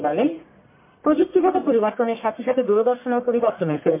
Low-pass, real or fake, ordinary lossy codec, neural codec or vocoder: 3.6 kHz; fake; MP3, 24 kbps; codec, 44.1 kHz, 1.7 kbps, Pupu-Codec